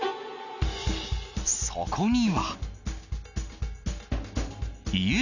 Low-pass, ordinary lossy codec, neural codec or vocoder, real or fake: 7.2 kHz; none; none; real